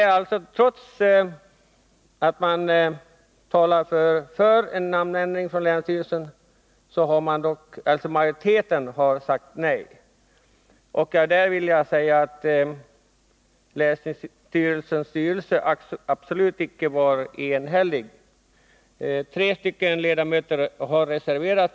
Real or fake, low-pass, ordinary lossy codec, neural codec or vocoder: real; none; none; none